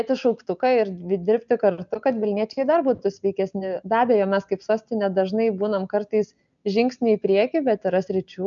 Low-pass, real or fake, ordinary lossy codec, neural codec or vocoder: 7.2 kHz; real; MP3, 96 kbps; none